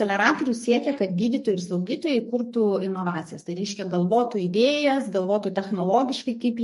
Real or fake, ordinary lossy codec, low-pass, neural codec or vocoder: fake; MP3, 48 kbps; 14.4 kHz; codec, 44.1 kHz, 2.6 kbps, SNAC